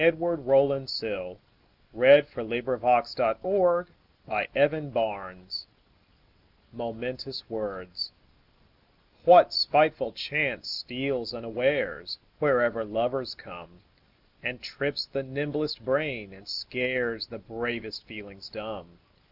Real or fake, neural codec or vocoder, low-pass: real; none; 5.4 kHz